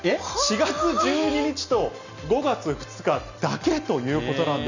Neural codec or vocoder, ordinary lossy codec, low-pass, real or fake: none; none; 7.2 kHz; real